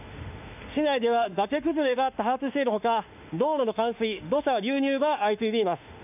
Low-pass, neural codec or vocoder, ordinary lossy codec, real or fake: 3.6 kHz; autoencoder, 48 kHz, 32 numbers a frame, DAC-VAE, trained on Japanese speech; none; fake